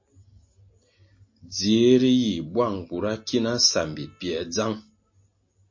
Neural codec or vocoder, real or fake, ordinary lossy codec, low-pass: none; real; MP3, 32 kbps; 7.2 kHz